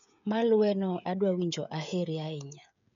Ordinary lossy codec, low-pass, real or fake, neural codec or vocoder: none; 7.2 kHz; fake; codec, 16 kHz, 16 kbps, FreqCodec, smaller model